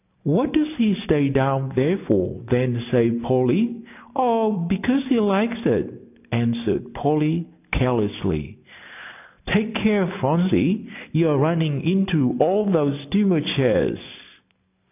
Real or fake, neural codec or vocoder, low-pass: real; none; 3.6 kHz